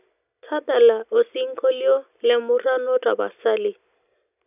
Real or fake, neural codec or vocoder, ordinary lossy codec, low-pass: real; none; none; 3.6 kHz